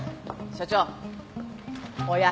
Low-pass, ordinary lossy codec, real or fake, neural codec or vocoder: none; none; real; none